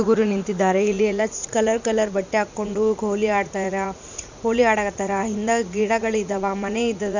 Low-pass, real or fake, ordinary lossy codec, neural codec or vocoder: 7.2 kHz; fake; none; vocoder, 44.1 kHz, 80 mel bands, Vocos